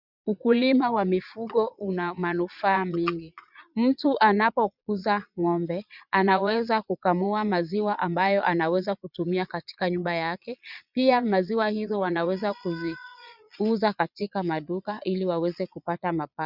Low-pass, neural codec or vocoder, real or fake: 5.4 kHz; vocoder, 22.05 kHz, 80 mel bands, Vocos; fake